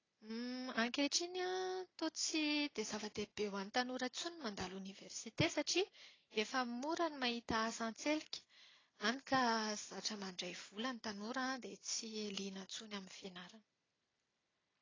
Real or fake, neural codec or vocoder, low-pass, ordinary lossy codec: real; none; 7.2 kHz; AAC, 32 kbps